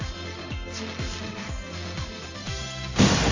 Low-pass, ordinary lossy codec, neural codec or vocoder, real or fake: 7.2 kHz; AAC, 48 kbps; codec, 16 kHz in and 24 kHz out, 1 kbps, XY-Tokenizer; fake